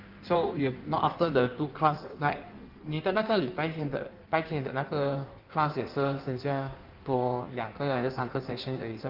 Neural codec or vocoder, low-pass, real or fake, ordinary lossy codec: codec, 16 kHz in and 24 kHz out, 1.1 kbps, FireRedTTS-2 codec; 5.4 kHz; fake; Opus, 32 kbps